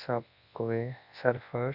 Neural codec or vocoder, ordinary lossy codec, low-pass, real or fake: codec, 24 kHz, 0.9 kbps, WavTokenizer, large speech release; none; 5.4 kHz; fake